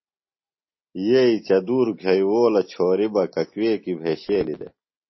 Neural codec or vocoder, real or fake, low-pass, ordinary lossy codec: none; real; 7.2 kHz; MP3, 24 kbps